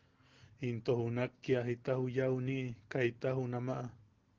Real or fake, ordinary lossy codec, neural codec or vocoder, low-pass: real; Opus, 16 kbps; none; 7.2 kHz